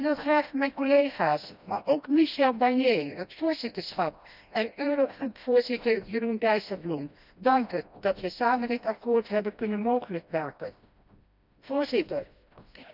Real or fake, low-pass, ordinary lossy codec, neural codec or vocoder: fake; 5.4 kHz; none; codec, 16 kHz, 1 kbps, FreqCodec, smaller model